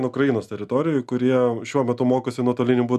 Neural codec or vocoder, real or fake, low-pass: none; real; 14.4 kHz